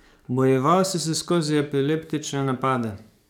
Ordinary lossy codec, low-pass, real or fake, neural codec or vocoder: none; 19.8 kHz; fake; codec, 44.1 kHz, 7.8 kbps, DAC